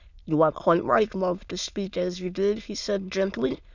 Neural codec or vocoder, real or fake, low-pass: autoencoder, 22.05 kHz, a latent of 192 numbers a frame, VITS, trained on many speakers; fake; 7.2 kHz